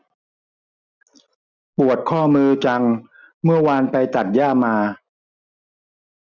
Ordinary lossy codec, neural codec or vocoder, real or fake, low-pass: none; none; real; 7.2 kHz